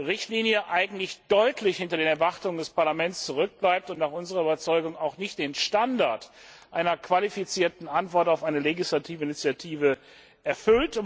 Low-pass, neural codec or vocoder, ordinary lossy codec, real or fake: none; none; none; real